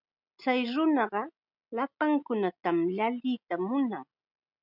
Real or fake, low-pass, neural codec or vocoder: real; 5.4 kHz; none